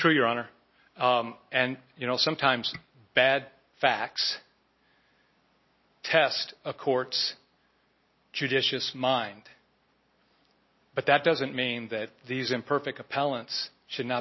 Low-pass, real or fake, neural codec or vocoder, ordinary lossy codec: 7.2 kHz; real; none; MP3, 24 kbps